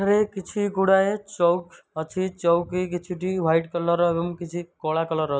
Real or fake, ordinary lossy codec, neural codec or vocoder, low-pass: real; none; none; none